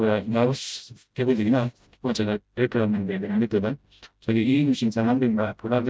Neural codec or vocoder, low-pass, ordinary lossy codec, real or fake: codec, 16 kHz, 0.5 kbps, FreqCodec, smaller model; none; none; fake